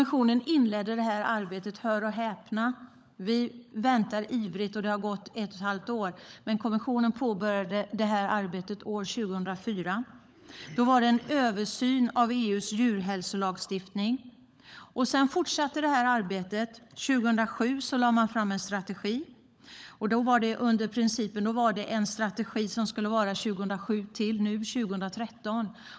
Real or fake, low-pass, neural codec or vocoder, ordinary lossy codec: fake; none; codec, 16 kHz, 16 kbps, FunCodec, trained on LibriTTS, 50 frames a second; none